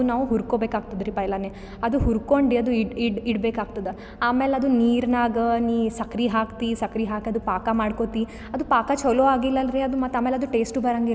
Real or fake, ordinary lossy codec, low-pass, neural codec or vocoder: real; none; none; none